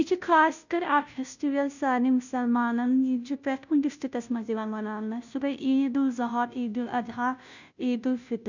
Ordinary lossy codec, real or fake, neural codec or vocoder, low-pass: none; fake; codec, 16 kHz, 0.5 kbps, FunCodec, trained on Chinese and English, 25 frames a second; 7.2 kHz